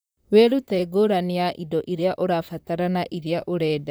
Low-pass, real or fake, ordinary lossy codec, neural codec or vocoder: none; fake; none; vocoder, 44.1 kHz, 128 mel bands, Pupu-Vocoder